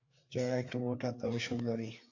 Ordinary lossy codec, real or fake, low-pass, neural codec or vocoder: AAC, 48 kbps; fake; 7.2 kHz; codec, 16 kHz, 4 kbps, FreqCodec, larger model